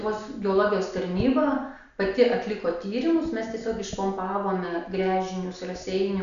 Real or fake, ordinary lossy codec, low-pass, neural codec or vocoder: real; MP3, 96 kbps; 7.2 kHz; none